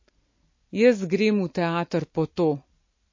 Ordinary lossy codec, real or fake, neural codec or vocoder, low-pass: MP3, 32 kbps; real; none; 7.2 kHz